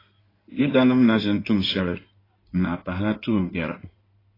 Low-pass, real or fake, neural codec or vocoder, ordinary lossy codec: 5.4 kHz; fake; codec, 16 kHz in and 24 kHz out, 2.2 kbps, FireRedTTS-2 codec; AAC, 24 kbps